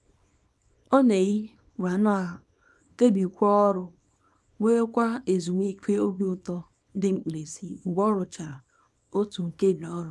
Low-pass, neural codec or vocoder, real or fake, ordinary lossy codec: none; codec, 24 kHz, 0.9 kbps, WavTokenizer, small release; fake; none